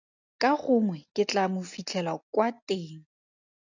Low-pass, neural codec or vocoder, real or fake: 7.2 kHz; none; real